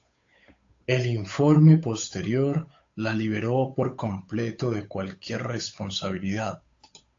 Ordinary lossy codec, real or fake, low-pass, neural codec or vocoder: AAC, 48 kbps; fake; 7.2 kHz; codec, 16 kHz, 8 kbps, FunCodec, trained on Chinese and English, 25 frames a second